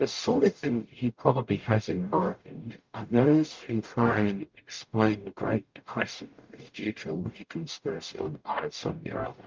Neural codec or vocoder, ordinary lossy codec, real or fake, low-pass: codec, 44.1 kHz, 0.9 kbps, DAC; Opus, 24 kbps; fake; 7.2 kHz